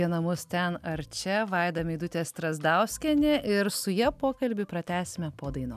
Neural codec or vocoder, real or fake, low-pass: none; real; 14.4 kHz